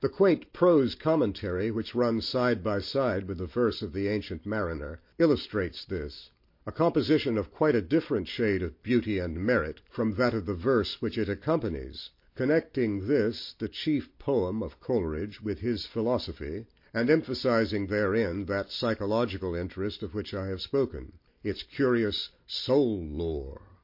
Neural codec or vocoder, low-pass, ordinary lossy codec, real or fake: none; 5.4 kHz; MP3, 32 kbps; real